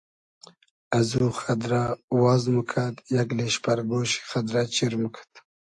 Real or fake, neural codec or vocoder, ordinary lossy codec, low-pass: real; none; AAC, 64 kbps; 10.8 kHz